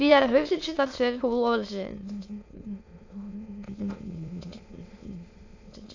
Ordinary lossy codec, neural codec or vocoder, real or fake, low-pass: AAC, 48 kbps; autoencoder, 22.05 kHz, a latent of 192 numbers a frame, VITS, trained on many speakers; fake; 7.2 kHz